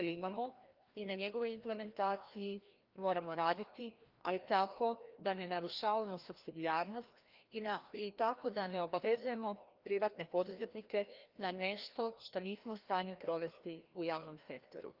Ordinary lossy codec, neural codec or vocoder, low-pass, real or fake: Opus, 32 kbps; codec, 16 kHz, 1 kbps, FreqCodec, larger model; 5.4 kHz; fake